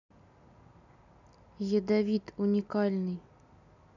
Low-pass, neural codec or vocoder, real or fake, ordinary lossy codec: 7.2 kHz; none; real; none